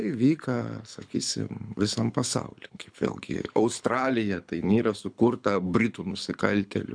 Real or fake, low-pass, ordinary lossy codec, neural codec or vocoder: fake; 9.9 kHz; MP3, 96 kbps; codec, 24 kHz, 6 kbps, HILCodec